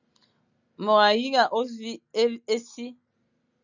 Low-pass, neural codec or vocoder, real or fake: 7.2 kHz; none; real